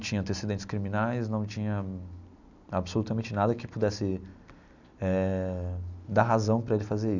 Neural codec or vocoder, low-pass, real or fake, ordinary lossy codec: none; 7.2 kHz; real; none